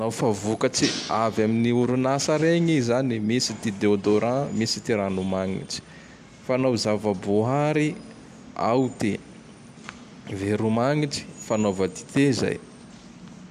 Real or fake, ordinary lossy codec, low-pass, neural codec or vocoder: real; none; 14.4 kHz; none